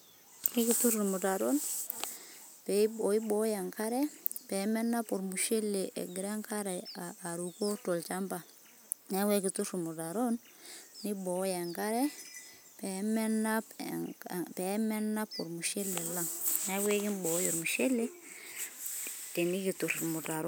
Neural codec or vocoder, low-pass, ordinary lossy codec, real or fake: none; none; none; real